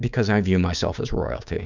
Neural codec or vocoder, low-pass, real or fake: codec, 16 kHz, 6 kbps, DAC; 7.2 kHz; fake